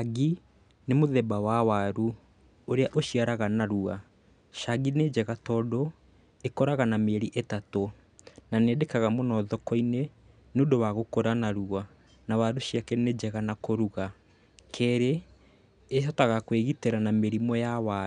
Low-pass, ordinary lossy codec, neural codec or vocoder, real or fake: 9.9 kHz; none; none; real